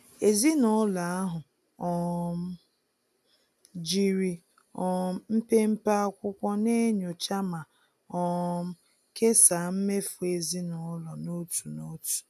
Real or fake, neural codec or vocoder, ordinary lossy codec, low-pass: real; none; none; 14.4 kHz